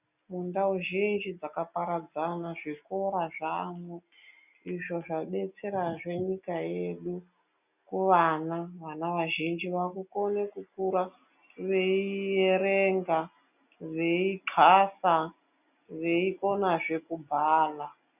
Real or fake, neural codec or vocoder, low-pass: real; none; 3.6 kHz